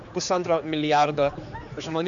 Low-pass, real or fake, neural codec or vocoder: 7.2 kHz; fake; codec, 16 kHz, 4 kbps, X-Codec, HuBERT features, trained on general audio